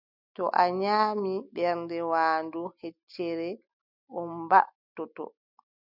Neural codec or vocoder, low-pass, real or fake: none; 5.4 kHz; real